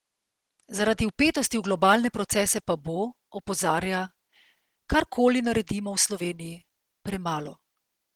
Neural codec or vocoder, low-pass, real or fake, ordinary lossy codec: none; 19.8 kHz; real; Opus, 16 kbps